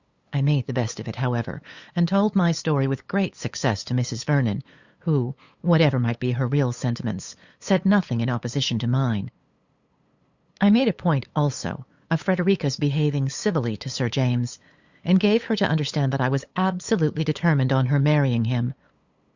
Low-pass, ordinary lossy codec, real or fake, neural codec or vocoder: 7.2 kHz; Opus, 64 kbps; fake; codec, 16 kHz, 8 kbps, FunCodec, trained on LibriTTS, 25 frames a second